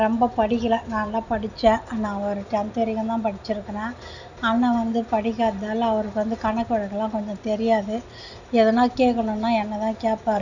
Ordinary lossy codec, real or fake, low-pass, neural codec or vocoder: none; real; 7.2 kHz; none